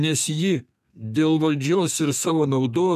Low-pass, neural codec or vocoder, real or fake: 14.4 kHz; codec, 32 kHz, 1.9 kbps, SNAC; fake